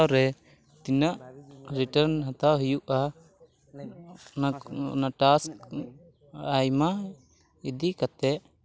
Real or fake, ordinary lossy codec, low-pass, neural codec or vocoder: real; none; none; none